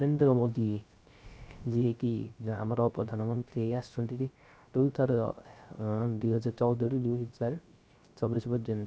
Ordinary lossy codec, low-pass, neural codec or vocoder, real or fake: none; none; codec, 16 kHz, 0.3 kbps, FocalCodec; fake